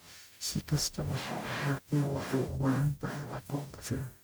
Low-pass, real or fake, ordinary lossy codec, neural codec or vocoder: none; fake; none; codec, 44.1 kHz, 0.9 kbps, DAC